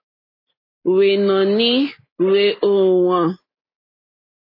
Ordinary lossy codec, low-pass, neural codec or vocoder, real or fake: MP3, 24 kbps; 5.4 kHz; none; real